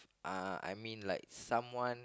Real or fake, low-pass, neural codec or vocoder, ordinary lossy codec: real; none; none; none